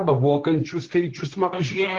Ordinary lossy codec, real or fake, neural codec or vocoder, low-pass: Opus, 16 kbps; fake; codec, 16 kHz, 1.1 kbps, Voila-Tokenizer; 7.2 kHz